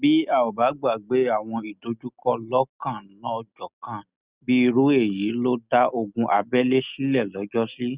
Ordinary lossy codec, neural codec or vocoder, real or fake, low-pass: Opus, 64 kbps; none; real; 3.6 kHz